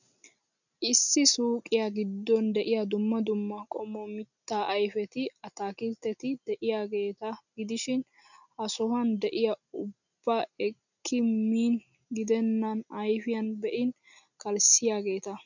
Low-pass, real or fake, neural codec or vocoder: 7.2 kHz; real; none